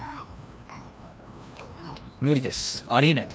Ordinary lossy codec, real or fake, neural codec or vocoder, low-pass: none; fake; codec, 16 kHz, 1 kbps, FreqCodec, larger model; none